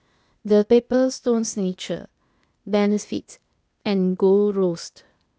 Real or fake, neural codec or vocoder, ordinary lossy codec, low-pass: fake; codec, 16 kHz, 0.8 kbps, ZipCodec; none; none